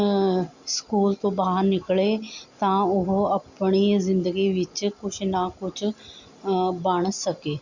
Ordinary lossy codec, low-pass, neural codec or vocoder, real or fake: Opus, 64 kbps; 7.2 kHz; none; real